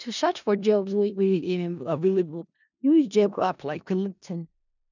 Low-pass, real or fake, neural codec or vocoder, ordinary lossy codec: 7.2 kHz; fake; codec, 16 kHz in and 24 kHz out, 0.4 kbps, LongCat-Audio-Codec, four codebook decoder; none